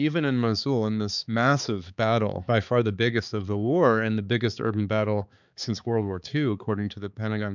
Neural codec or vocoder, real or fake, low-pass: codec, 16 kHz, 4 kbps, X-Codec, HuBERT features, trained on balanced general audio; fake; 7.2 kHz